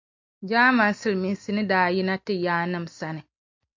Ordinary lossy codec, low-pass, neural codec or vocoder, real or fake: MP3, 64 kbps; 7.2 kHz; none; real